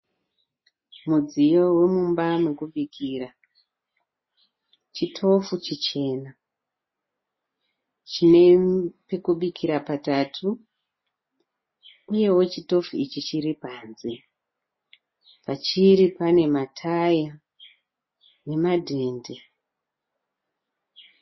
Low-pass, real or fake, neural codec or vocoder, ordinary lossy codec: 7.2 kHz; real; none; MP3, 24 kbps